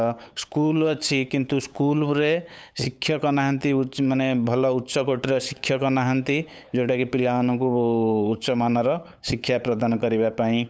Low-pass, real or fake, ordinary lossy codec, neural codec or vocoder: none; fake; none; codec, 16 kHz, 8 kbps, FunCodec, trained on LibriTTS, 25 frames a second